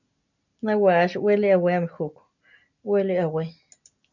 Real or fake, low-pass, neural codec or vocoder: real; 7.2 kHz; none